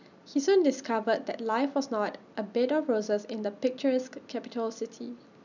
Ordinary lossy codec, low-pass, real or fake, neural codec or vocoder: none; 7.2 kHz; real; none